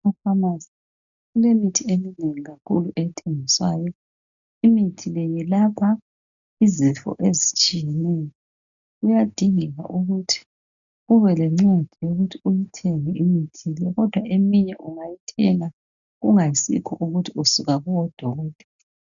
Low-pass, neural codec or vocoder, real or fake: 7.2 kHz; none; real